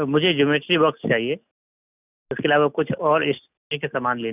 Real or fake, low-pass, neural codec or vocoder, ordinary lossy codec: real; 3.6 kHz; none; none